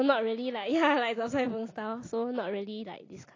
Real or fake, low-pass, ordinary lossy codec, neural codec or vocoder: real; 7.2 kHz; AAC, 32 kbps; none